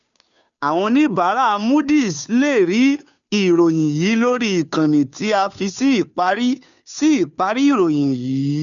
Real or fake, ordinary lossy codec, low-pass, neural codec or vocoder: fake; none; 7.2 kHz; codec, 16 kHz, 2 kbps, FunCodec, trained on Chinese and English, 25 frames a second